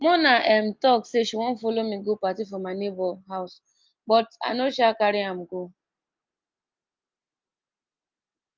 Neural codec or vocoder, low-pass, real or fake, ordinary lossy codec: none; 7.2 kHz; real; Opus, 32 kbps